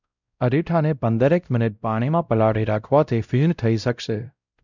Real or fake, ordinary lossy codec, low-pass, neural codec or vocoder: fake; none; 7.2 kHz; codec, 16 kHz, 0.5 kbps, X-Codec, WavLM features, trained on Multilingual LibriSpeech